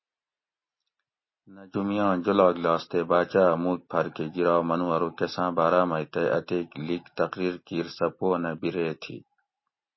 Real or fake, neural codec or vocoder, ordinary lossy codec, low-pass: real; none; MP3, 24 kbps; 7.2 kHz